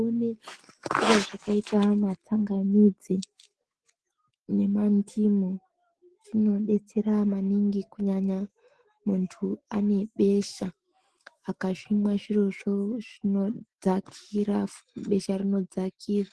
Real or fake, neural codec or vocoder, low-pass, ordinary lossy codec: fake; autoencoder, 48 kHz, 128 numbers a frame, DAC-VAE, trained on Japanese speech; 10.8 kHz; Opus, 16 kbps